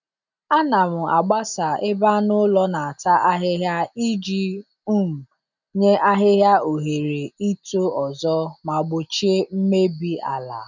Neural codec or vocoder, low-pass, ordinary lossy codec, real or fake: none; 7.2 kHz; none; real